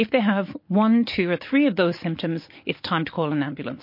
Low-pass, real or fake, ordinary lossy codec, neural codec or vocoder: 5.4 kHz; real; MP3, 32 kbps; none